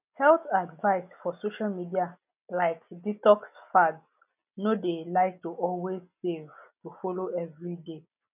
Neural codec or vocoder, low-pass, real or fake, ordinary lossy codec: none; 3.6 kHz; real; none